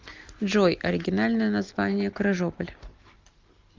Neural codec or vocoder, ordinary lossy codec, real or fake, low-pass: none; Opus, 32 kbps; real; 7.2 kHz